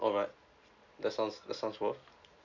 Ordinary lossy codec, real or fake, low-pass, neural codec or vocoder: none; real; 7.2 kHz; none